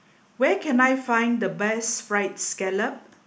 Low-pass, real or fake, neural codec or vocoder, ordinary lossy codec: none; real; none; none